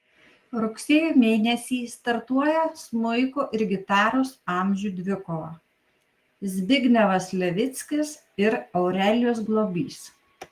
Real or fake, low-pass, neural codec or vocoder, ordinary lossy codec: real; 14.4 kHz; none; Opus, 24 kbps